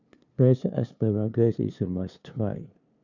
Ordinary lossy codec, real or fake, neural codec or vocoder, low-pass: none; fake; codec, 16 kHz, 2 kbps, FunCodec, trained on LibriTTS, 25 frames a second; 7.2 kHz